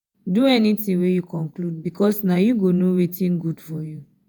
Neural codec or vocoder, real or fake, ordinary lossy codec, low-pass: vocoder, 48 kHz, 128 mel bands, Vocos; fake; none; none